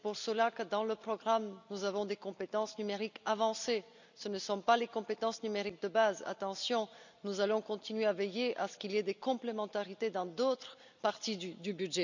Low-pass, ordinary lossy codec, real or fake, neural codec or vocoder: 7.2 kHz; none; real; none